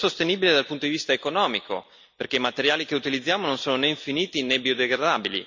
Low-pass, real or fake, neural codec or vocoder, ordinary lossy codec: 7.2 kHz; real; none; MP3, 64 kbps